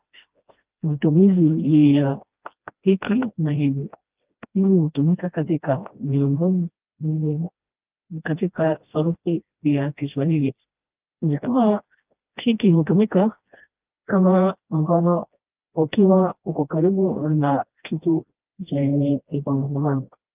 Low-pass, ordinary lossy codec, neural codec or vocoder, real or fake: 3.6 kHz; Opus, 24 kbps; codec, 16 kHz, 1 kbps, FreqCodec, smaller model; fake